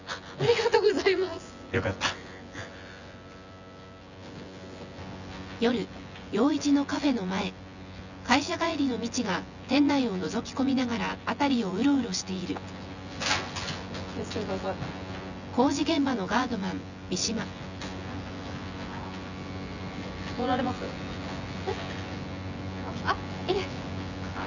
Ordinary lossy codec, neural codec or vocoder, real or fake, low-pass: none; vocoder, 24 kHz, 100 mel bands, Vocos; fake; 7.2 kHz